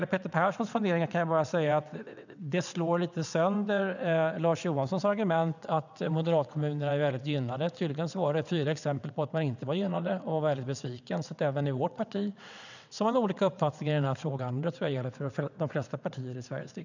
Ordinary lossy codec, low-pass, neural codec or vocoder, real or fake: none; 7.2 kHz; vocoder, 22.05 kHz, 80 mel bands, WaveNeXt; fake